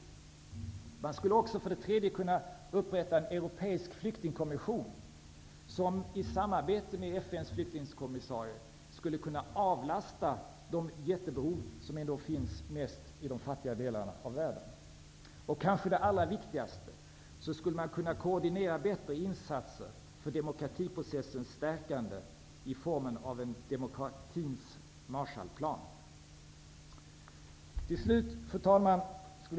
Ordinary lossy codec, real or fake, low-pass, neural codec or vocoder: none; real; none; none